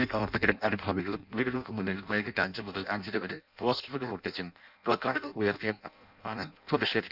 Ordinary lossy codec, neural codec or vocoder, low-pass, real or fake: none; codec, 16 kHz in and 24 kHz out, 0.6 kbps, FireRedTTS-2 codec; 5.4 kHz; fake